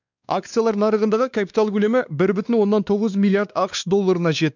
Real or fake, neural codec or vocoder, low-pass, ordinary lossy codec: fake; codec, 16 kHz, 2 kbps, X-Codec, WavLM features, trained on Multilingual LibriSpeech; 7.2 kHz; none